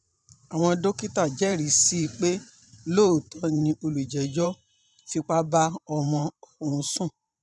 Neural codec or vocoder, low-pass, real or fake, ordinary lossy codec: vocoder, 44.1 kHz, 128 mel bands every 512 samples, BigVGAN v2; 10.8 kHz; fake; none